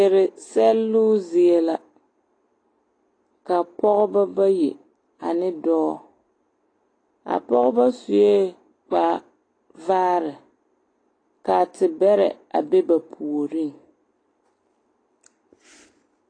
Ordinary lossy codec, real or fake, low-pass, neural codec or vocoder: AAC, 32 kbps; real; 9.9 kHz; none